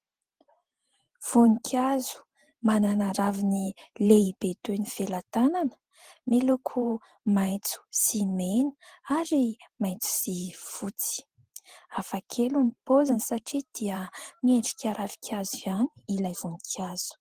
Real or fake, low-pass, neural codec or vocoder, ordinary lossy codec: fake; 14.4 kHz; vocoder, 44.1 kHz, 128 mel bands every 512 samples, BigVGAN v2; Opus, 16 kbps